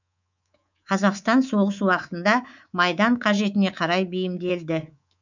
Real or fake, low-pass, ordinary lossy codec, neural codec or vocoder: fake; 7.2 kHz; none; codec, 24 kHz, 3.1 kbps, DualCodec